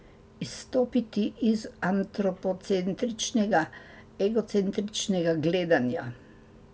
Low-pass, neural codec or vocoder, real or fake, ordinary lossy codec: none; none; real; none